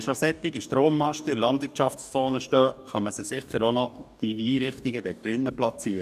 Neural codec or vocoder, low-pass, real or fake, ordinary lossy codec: codec, 44.1 kHz, 2.6 kbps, DAC; 14.4 kHz; fake; none